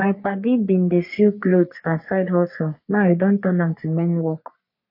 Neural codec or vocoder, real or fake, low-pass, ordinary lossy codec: codec, 44.1 kHz, 3.4 kbps, Pupu-Codec; fake; 5.4 kHz; MP3, 32 kbps